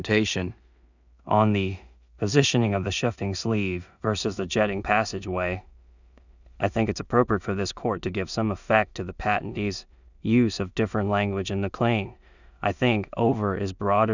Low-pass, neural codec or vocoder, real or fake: 7.2 kHz; codec, 16 kHz in and 24 kHz out, 0.4 kbps, LongCat-Audio-Codec, two codebook decoder; fake